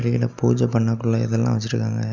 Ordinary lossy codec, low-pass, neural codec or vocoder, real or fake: none; 7.2 kHz; none; real